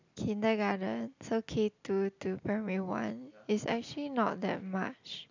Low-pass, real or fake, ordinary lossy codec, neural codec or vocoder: 7.2 kHz; real; none; none